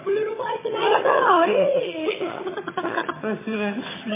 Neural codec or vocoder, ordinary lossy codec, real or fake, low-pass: vocoder, 22.05 kHz, 80 mel bands, HiFi-GAN; MP3, 16 kbps; fake; 3.6 kHz